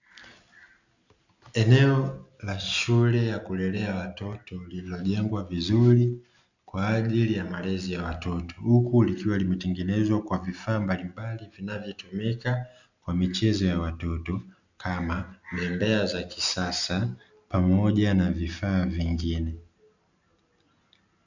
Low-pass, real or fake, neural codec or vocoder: 7.2 kHz; real; none